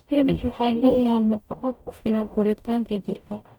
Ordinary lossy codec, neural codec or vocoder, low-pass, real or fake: none; codec, 44.1 kHz, 0.9 kbps, DAC; 19.8 kHz; fake